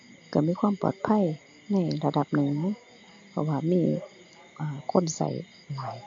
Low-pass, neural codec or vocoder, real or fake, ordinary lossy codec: 7.2 kHz; none; real; AAC, 48 kbps